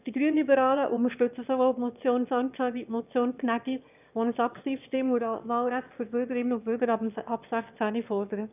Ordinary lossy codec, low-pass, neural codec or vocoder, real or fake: none; 3.6 kHz; autoencoder, 22.05 kHz, a latent of 192 numbers a frame, VITS, trained on one speaker; fake